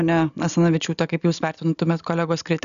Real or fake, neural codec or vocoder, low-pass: real; none; 7.2 kHz